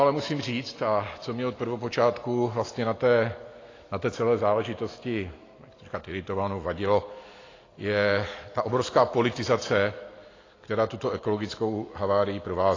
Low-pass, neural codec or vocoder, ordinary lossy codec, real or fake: 7.2 kHz; none; AAC, 32 kbps; real